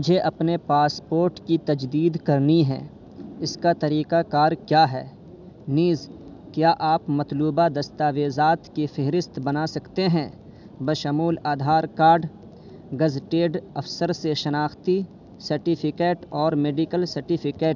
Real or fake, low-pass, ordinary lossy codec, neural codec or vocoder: real; 7.2 kHz; none; none